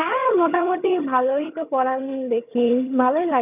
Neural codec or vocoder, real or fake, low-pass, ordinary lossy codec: vocoder, 22.05 kHz, 80 mel bands, HiFi-GAN; fake; 3.6 kHz; none